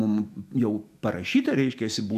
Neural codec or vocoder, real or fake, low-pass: autoencoder, 48 kHz, 128 numbers a frame, DAC-VAE, trained on Japanese speech; fake; 14.4 kHz